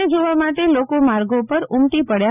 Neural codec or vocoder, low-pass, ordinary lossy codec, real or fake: none; 3.6 kHz; none; real